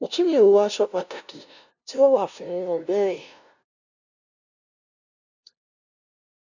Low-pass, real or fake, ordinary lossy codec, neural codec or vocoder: 7.2 kHz; fake; none; codec, 16 kHz, 0.5 kbps, FunCodec, trained on LibriTTS, 25 frames a second